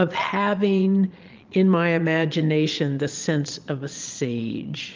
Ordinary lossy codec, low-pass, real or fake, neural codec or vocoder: Opus, 24 kbps; 7.2 kHz; fake; vocoder, 22.05 kHz, 80 mel bands, Vocos